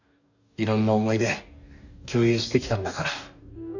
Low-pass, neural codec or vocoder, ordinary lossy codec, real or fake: 7.2 kHz; codec, 44.1 kHz, 2.6 kbps, DAC; none; fake